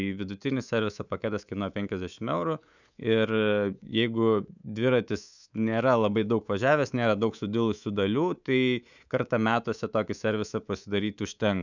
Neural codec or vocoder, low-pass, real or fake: codec, 24 kHz, 3.1 kbps, DualCodec; 7.2 kHz; fake